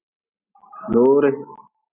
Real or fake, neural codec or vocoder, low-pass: real; none; 3.6 kHz